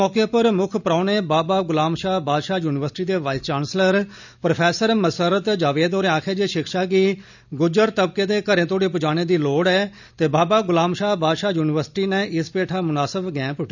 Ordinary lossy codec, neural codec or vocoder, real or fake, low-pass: none; none; real; 7.2 kHz